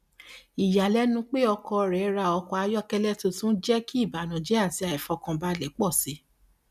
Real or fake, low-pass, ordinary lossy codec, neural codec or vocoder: real; 14.4 kHz; none; none